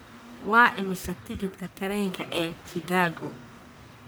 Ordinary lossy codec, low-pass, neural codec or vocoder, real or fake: none; none; codec, 44.1 kHz, 1.7 kbps, Pupu-Codec; fake